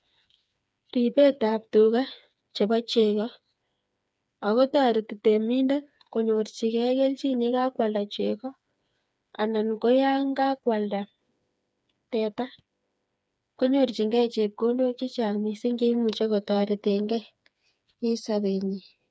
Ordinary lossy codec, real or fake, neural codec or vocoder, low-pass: none; fake; codec, 16 kHz, 4 kbps, FreqCodec, smaller model; none